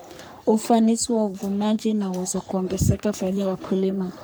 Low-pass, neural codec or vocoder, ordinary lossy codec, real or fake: none; codec, 44.1 kHz, 3.4 kbps, Pupu-Codec; none; fake